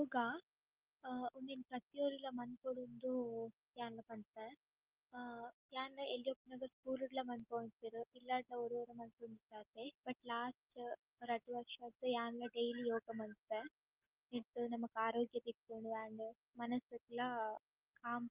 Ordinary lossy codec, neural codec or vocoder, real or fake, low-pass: Opus, 24 kbps; none; real; 3.6 kHz